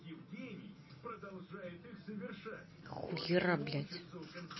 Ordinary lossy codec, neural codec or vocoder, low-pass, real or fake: MP3, 24 kbps; none; 7.2 kHz; real